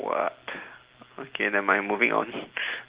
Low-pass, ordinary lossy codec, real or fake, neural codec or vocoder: 3.6 kHz; Opus, 16 kbps; real; none